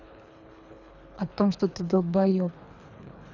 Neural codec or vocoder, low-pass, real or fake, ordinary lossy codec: codec, 24 kHz, 3 kbps, HILCodec; 7.2 kHz; fake; none